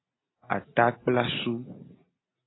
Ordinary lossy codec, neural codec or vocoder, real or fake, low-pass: AAC, 16 kbps; none; real; 7.2 kHz